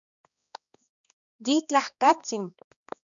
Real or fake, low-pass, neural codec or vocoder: fake; 7.2 kHz; codec, 16 kHz, 2 kbps, X-Codec, HuBERT features, trained on balanced general audio